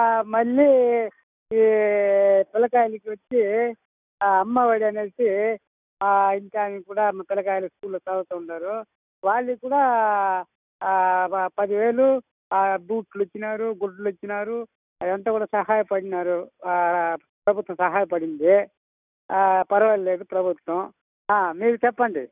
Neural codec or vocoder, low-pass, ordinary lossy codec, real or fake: none; 3.6 kHz; none; real